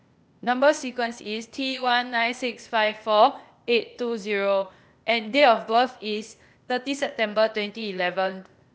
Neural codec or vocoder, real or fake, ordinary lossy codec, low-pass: codec, 16 kHz, 0.8 kbps, ZipCodec; fake; none; none